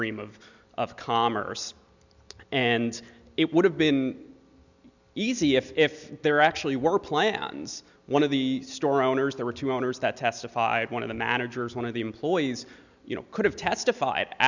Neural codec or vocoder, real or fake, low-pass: none; real; 7.2 kHz